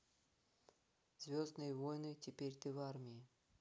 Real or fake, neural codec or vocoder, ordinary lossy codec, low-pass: real; none; none; none